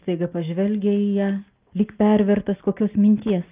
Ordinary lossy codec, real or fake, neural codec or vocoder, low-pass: Opus, 24 kbps; real; none; 3.6 kHz